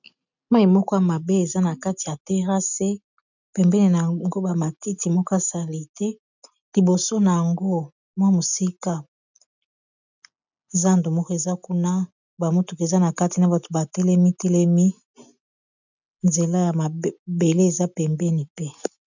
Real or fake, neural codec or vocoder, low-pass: real; none; 7.2 kHz